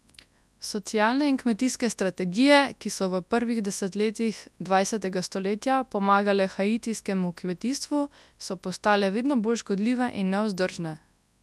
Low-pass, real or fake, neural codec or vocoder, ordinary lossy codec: none; fake; codec, 24 kHz, 0.9 kbps, WavTokenizer, large speech release; none